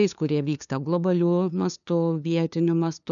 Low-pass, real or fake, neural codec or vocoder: 7.2 kHz; fake; codec, 16 kHz, 2 kbps, FunCodec, trained on LibriTTS, 25 frames a second